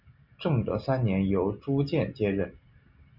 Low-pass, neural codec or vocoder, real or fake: 5.4 kHz; none; real